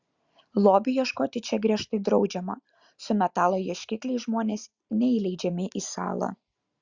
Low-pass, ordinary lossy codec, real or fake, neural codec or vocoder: 7.2 kHz; Opus, 64 kbps; real; none